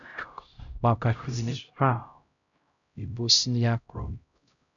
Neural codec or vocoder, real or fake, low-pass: codec, 16 kHz, 0.5 kbps, X-Codec, HuBERT features, trained on LibriSpeech; fake; 7.2 kHz